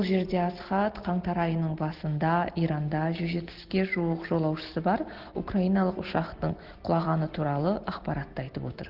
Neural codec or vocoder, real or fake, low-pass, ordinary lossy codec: none; real; 5.4 kHz; Opus, 16 kbps